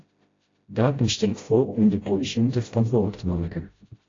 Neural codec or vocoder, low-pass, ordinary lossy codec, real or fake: codec, 16 kHz, 0.5 kbps, FreqCodec, smaller model; 7.2 kHz; AAC, 32 kbps; fake